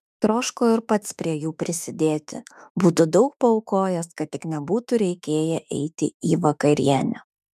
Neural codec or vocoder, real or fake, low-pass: autoencoder, 48 kHz, 32 numbers a frame, DAC-VAE, trained on Japanese speech; fake; 14.4 kHz